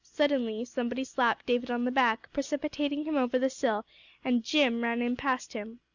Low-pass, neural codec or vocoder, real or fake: 7.2 kHz; none; real